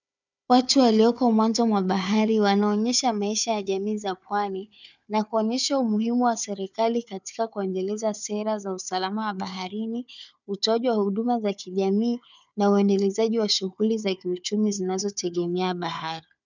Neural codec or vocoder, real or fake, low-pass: codec, 16 kHz, 4 kbps, FunCodec, trained on Chinese and English, 50 frames a second; fake; 7.2 kHz